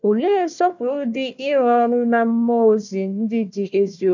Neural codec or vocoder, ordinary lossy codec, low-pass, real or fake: codec, 16 kHz, 1 kbps, FunCodec, trained on Chinese and English, 50 frames a second; none; 7.2 kHz; fake